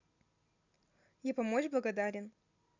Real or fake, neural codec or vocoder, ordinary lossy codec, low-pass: real; none; none; 7.2 kHz